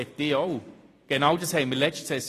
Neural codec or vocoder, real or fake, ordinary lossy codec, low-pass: vocoder, 44.1 kHz, 128 mel bands every 256 samples, BigVGAN v2; fake; AAC, 48 kbps; 14.4 kHz